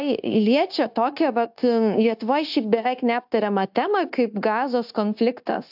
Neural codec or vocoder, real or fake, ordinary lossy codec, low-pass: codec, 16 kHz, 0.9 kbps, LongCat-Audio-Codec; fake; AAC, 48 kbps; 5.4 kHz